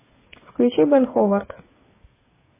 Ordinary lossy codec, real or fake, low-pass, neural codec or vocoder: MP3, 16 kbps; fake; 3.6 kHz; codec, 16 kHz, 8 kbps, FreqCodec, smaller model